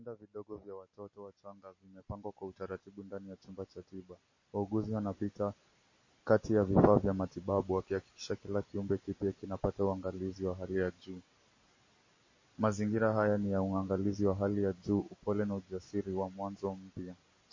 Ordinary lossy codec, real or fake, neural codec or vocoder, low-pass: MP3, 32 kbps; real; none; 7.2 kHz